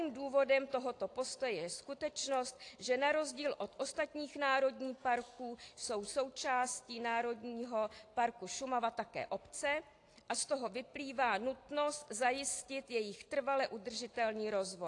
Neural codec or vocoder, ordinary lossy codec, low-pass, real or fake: none; AAC, 48 kbps; 10.8 kHz; real